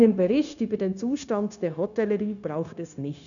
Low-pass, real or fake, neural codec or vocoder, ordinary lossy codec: 7.2 kHz; fake; codec, 16 kHz, 0.9 kbps, LongCat-Audio-Codec; none